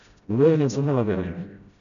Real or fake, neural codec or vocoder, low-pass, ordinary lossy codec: fake; codec, 16 kHz, 0.5 kbps, FreqCodec, smaller model; 7.2 kHz; none